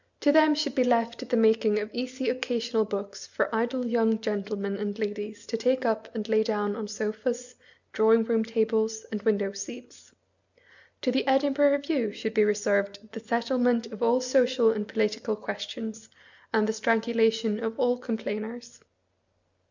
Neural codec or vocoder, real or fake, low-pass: none; real; 7.2 kHz